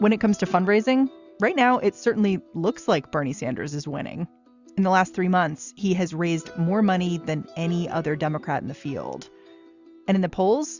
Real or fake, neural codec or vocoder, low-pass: real; none; 7.2 kHz